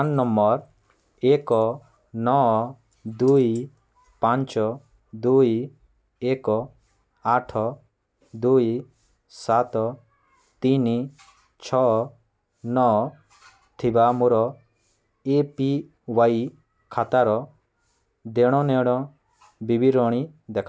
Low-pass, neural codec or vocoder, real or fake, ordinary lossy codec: none; none; real; none